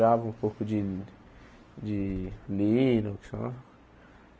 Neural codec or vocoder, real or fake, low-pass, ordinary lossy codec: none; real; none; none